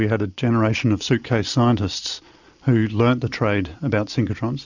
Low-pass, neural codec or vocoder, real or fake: 7.2 kHz; none; real